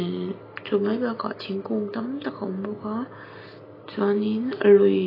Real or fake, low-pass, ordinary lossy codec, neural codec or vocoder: real; 5.4 kHz; AAC, 24 kbps; none